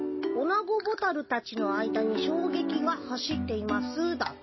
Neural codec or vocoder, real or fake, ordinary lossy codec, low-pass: none; real; MP3, 24 kbps; 7.2 kHz